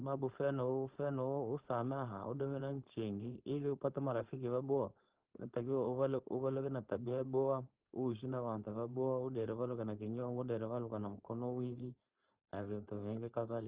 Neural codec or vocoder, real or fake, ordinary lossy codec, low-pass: codec, 16 kHz, 4.8 kbps, FACodec; fake; Opus, 16 kbps; 3.6 kHz